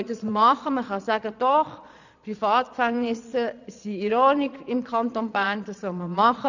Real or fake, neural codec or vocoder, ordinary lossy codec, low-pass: fake; vocoder, 22.05 kHz, 80 mel bands, Vocos; none; 7.2 kHz